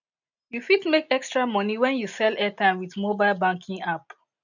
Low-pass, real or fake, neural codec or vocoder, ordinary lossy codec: 7.2 kHz; real; none; none